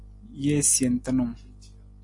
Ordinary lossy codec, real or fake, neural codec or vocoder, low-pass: AAC, 64 kbps; real; none; 10.8 kHz